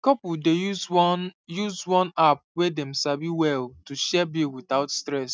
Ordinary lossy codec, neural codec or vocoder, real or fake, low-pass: none; none; real; none